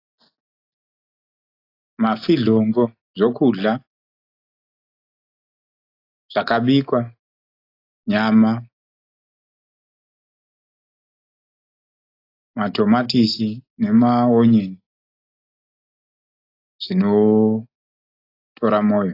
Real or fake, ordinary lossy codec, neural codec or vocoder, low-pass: real; AAC, 32 kbps; none; 5.4 kHz